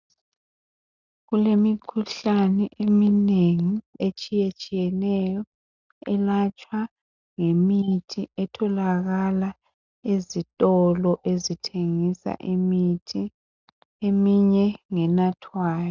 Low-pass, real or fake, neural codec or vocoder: 7.2 kHz; real; none